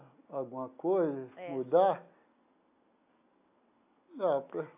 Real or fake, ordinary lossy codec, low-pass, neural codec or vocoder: real; AAC, 32 kbps; 3.6 kHz; none